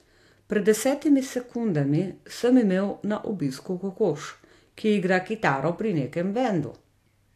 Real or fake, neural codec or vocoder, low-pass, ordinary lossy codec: real; none; 14.4 kHz; AAC, 64 kbps